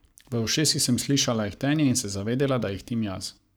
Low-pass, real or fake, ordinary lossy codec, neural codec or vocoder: none; fake; none; codec, 44.1 kHz, 7.8 kbps, Pupu-Codec